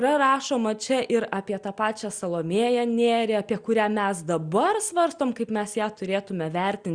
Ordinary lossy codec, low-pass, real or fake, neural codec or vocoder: Opus, 64 kbps; 9.9 kHz; real; none